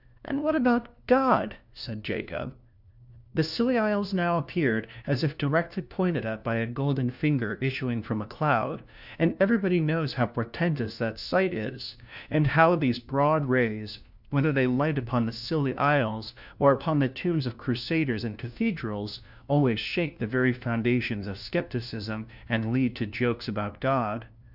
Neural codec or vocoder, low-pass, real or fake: codec, 16 kHz, 1 kbps, FunCodec, trained on LibriTTS, 50 frames a second; 5.4 kHz; fake